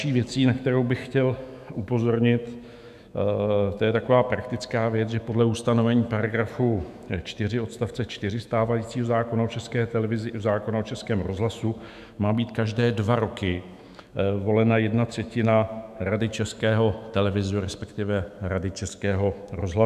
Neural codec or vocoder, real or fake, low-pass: autoencoder, 48 kHz, 128 numbers a frame, DAC-VAE, trained on Japanese speech; fake; 14.4 kHz